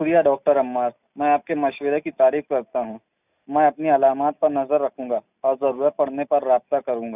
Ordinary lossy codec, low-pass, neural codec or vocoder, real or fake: none; 3.6 kHz; none; real